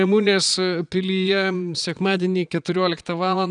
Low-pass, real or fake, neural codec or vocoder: 9.9 kHz; fake; vocoder, 22.05 kHz, 80 mel bands, Vocos